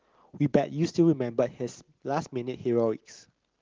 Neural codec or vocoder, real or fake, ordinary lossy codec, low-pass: none; real; Opus, 16 kbps; 7.2 kHz